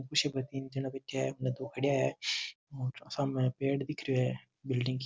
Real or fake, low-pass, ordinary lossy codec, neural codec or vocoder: real; none; none; none